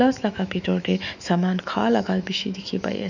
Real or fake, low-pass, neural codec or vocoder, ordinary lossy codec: fake; 7.2 kHz; autoencoder, 48 kHz, 128 numbers a frame, DAC-VAE, trained on Japanese speech; none